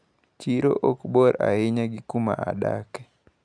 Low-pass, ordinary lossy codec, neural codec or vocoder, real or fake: 9.9 kHz; none; none; real